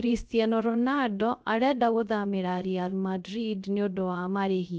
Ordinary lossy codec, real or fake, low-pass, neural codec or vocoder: none; fake; none; codec, 16 kHz, 0.3 kbps, FocalCodec